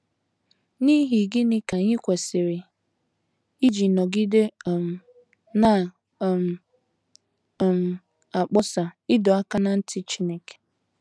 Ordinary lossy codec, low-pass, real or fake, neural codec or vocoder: none; none; real; none